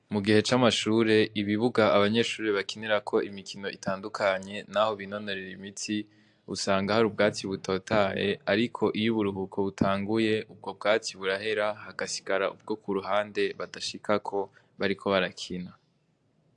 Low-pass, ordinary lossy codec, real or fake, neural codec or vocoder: 10.8 kHz; AAC, 64 kbps; real; none